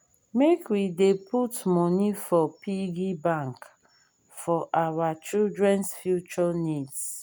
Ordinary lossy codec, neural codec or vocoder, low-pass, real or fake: none; none; none; real